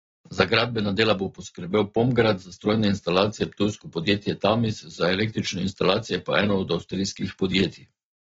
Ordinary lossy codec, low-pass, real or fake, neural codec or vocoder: AAC, 24 kbps; 7.2 kHz; real; none